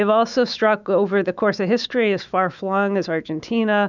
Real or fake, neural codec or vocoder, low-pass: fake; autoencoder, 48 kHz, 128 numbers a frame, DAC-VAE, trained on Japanese speech; 7.2 kHz